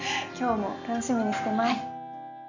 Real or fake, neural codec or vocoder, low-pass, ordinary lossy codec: real; none; 7.2 kHz; none